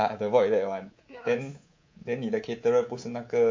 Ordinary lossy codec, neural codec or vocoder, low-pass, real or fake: MP3, 48 kbps; codec, 24 kHz, 3.1 kbps, DualCodec; 7.2 kHz; fake